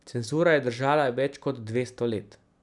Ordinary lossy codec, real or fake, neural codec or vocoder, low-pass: none; real; none; 10.8 kHz